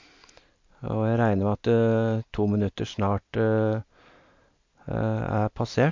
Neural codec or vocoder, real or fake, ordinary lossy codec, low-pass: none; real; MP3, 48 kbps; 7.2 kHz